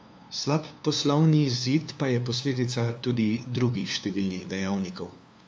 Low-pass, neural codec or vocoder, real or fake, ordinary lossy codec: none; codec, 16 kHz, 2 kbps, FunCodec, trained on LibriTTS, 25 frames a second; fake; none